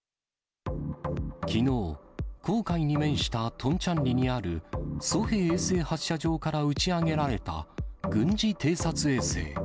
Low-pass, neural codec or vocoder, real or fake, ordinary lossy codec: none; none; real; none